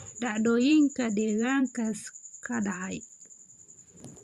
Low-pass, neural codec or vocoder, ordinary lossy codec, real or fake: 14.4 kHz; vocoder, 44.1 kHz, 128 mel bands every 512 samples, BigVGAN v2; Opus, 64 kbps; fake